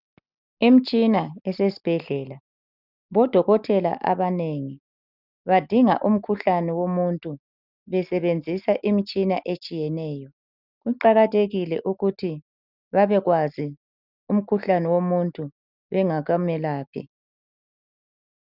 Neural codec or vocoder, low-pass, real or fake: none; 5.4 kHz; real